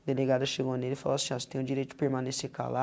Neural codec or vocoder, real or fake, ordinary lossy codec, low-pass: none; real; none; none